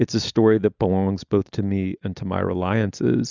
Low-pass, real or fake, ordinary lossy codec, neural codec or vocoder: 7.2 kHz; real; Opus, 64 kbps; none